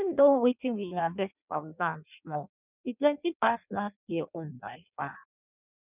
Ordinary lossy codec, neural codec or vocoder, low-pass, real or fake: none; codec, 16 kHz in and 24 kHz out, 0.6 kbps, FireRedTTS-2 codec; 3.6 kHz; fake